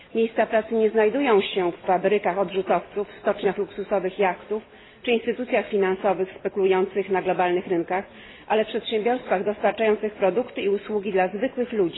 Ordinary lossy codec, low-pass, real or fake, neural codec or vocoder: AAC, 16 kbps; 7.2 kHz; real; none